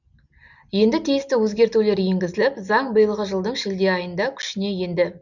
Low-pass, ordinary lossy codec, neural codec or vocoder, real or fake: 7.2 kHz; none; none; real